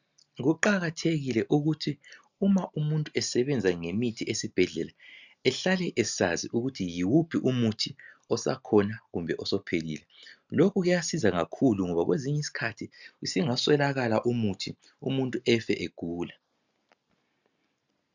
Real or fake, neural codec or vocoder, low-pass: real; none; 7.2 kHz